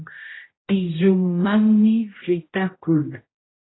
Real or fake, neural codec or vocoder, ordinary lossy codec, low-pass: fake; codec, 16 kHz, 0.5 kbps, X-Codec, HuBERT features, trained on general audio; AAC, 16 kbps; 7.2 kHz